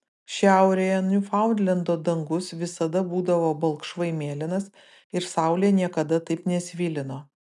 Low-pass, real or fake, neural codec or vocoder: 10.8 kHz; real; none